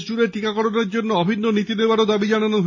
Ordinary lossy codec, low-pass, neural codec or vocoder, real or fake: MP3, 32 kbps; 7.2 kHz; none; real